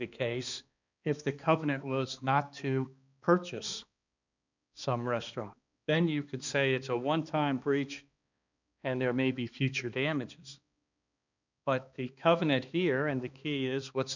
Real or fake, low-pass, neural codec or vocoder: fake; 7.2 kHz; codec, 16 kHz, 2 kbps, X-Codec, HuBERT features, trained on balanced general audio